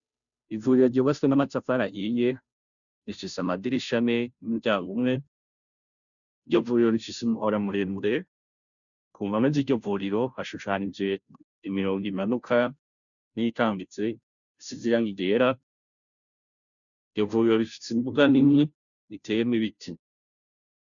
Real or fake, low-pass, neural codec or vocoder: fake; 7.2 kHz; codec, 16 kHz, 0.5 kbps, FunCodec, trained on Chinese and English, 25 frames a second